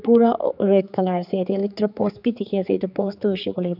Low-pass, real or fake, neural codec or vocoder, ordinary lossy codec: 5.4 kHz; fake; codec, 16 kHz, 4 kbps, X-Codec, HuBERT features, trained on general audio; none